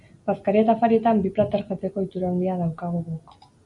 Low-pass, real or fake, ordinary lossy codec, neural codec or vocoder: 10.8 kHz; real; MP3, 64 kbps; none